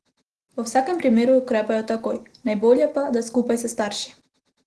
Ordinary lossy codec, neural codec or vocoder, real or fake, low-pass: Opus, 16 kbps; none; real; 10.8 kHz